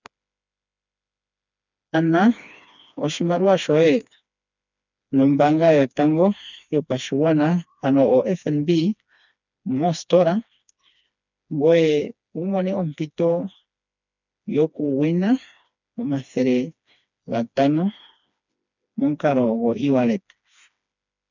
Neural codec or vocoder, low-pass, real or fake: codec, 16 kHz, 2 kbps, FreqCodec, smaller model; 7.2 kHz; fake